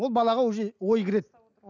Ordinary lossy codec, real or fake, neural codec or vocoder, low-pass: none; real; none; 7.2 kHz